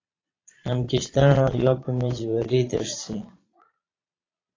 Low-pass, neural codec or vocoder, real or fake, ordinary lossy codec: 7.2 kHz; vocoder, 22.05 kHz, 80 mel bands, Vocos; fake; AAC, 32 kbps